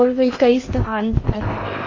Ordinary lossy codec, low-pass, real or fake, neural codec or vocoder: MP3, 32 kbps; 7.2 kHz; fake; codec, 16 kHz in and 24 kHz out, 0.8 kbps, FocalCodec, streaming, 65536 codes